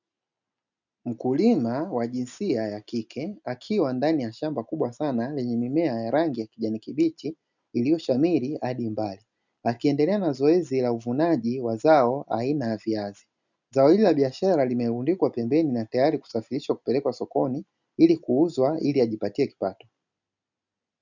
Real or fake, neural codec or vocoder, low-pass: real; none; 7.2 kHz